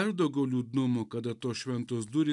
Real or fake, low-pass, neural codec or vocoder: real; 10.8 kHz; none